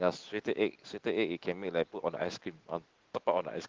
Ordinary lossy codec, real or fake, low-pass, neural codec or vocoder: Opus, 16 kbps; real; 7.2 kHz; none